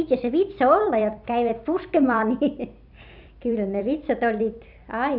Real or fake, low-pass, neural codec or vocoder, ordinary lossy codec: fake; 5.4 kHz; vocoder, 44.1 kHz, 128 mel bands every 256 samples, BigVGAN v2; none